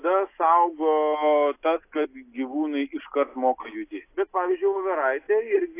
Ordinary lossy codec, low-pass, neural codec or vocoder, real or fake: AAC, 24 kbps; 3.6 kHz; none; real